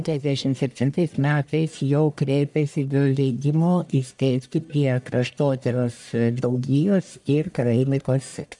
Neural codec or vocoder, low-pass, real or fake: codec, 44.1 kHz, 1.7 kbps, Pupu-Codec; 10.8 kHz; fake